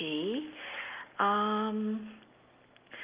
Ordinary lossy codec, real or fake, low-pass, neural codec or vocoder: Opus, 32 kbps; real; 3.6 kHz; none